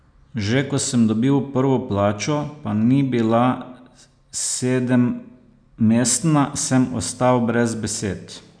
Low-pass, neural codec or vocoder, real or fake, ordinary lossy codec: 9.9 kHz; none; real; none